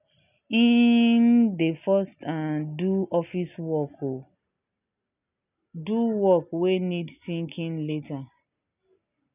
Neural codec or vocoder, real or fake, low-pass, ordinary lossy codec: none; real; 3.6 kHz; none